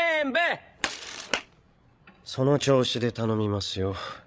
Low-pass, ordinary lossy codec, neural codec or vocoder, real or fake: none; none; codec, 16 kHz, 16 kbps, FreqCodec, larger model; fake